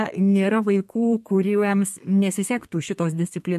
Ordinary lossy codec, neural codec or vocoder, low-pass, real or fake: MP3, 64 kbps; codec, 32 kHz, 1.9 kbps, SNAC; 14.4 kHz; fake